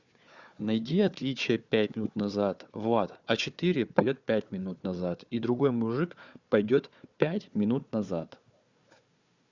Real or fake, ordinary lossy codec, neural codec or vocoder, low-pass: fake; Opus, 64 kbps; codec, 16 kHz, 4 kbps, FunCodec, trained on Chinese and English, 50 frames a second; 7.2 kHz